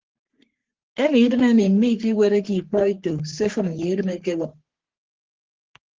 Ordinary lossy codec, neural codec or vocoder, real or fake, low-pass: Opus, 16 kbps; codec, 44.1 kHz, 3.4 kbps, Pupu-Codec; fake; 7.2 kHz